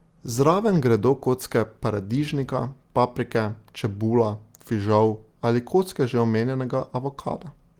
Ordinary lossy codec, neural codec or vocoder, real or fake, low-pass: Opus, 24 kbps; none; real; 19.8 kHz